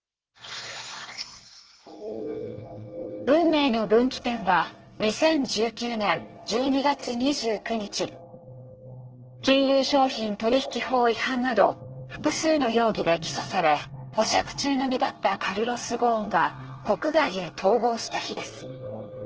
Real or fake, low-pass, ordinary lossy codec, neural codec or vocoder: fake; 7.2 kHz; Opus, 16 kbps; codec, 24 kHz, 1 kbps, SNAC